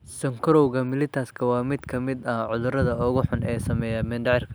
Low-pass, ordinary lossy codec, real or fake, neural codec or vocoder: none; none; real; none